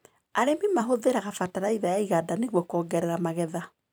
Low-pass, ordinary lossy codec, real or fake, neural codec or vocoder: none; none; fake; vocoder, 44.1 kHz, 128 mel bands every 256 samples, BigVGAN v2